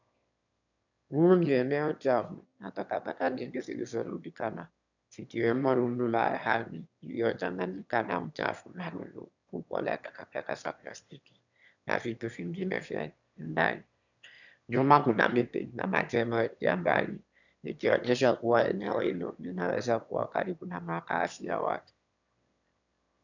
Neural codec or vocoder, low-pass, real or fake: autoencoder, 22.05 kHz, a latent of 192 numbers a frame, VITS, trained on one speaker; 7.2 kHz; fake